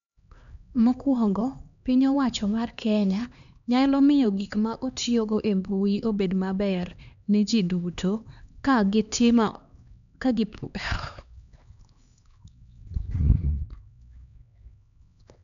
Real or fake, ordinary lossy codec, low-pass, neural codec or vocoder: fake; none; 7.2 kHz; codec, 16 kHz, 2 kbps, X-Codec, HuBERT features, trained on LibriSpeech